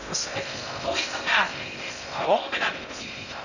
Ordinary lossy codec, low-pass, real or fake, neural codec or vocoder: none; 7.2 kHz; fake; codec, 16 kHz in and 24 kHz out, 0.6 kbps, FocalCodec, streaming, 2048 codes